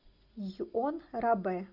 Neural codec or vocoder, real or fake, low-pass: none; real; 5.4 kHz